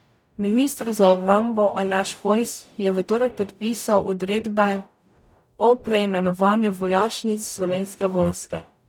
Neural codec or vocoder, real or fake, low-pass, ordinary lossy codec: codec, 44.1 kHz, 0.9 kbps, DAC; fake; 19.8 kHz; none